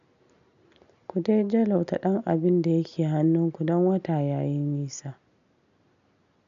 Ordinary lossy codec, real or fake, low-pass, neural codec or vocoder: none; real; 7.2 kHz; none